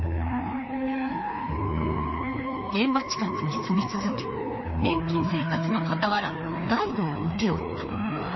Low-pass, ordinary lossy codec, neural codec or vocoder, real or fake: 7.2 kHz; MP3, 24 kbps; codec, 16 kHz, 2 kbps, FreqCodec, larger model; fake